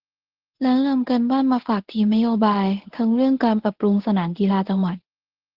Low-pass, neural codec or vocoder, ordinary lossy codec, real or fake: 5.4 kHz; codec, 24 kHz, 0.9 kbps, WavTokenizer, medium speech release version 1; Opus, 16 kbps; fake